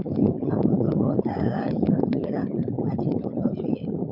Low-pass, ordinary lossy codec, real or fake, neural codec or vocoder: 5.4 kHz; MP3, 48 kbps; fake; codec, 16 kHz, 16 kbps, FunCodec, trained on LibriTTS, 50 frames a second